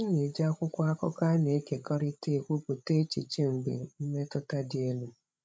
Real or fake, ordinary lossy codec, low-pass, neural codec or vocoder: fake; none; none; codec, 16 kHz, 16 kbps, FreqCodec, larger model